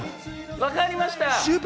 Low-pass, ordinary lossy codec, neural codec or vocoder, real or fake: none; none; none; real